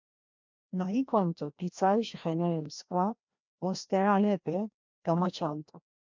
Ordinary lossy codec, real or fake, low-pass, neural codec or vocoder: MP3, 64 kbps; fake; 7.2 kHz; codec, 16 kHz, 1 kbps, FreqCodec, larger model